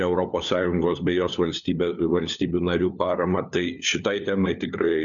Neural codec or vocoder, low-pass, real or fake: codec, 16 kHz, 4 kbps, FunCodec, trained on LibriTTS, 50 frames a second; 7.2 kHz; fake